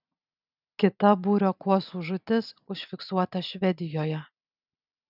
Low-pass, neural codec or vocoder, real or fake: 5.4 kHz; none; real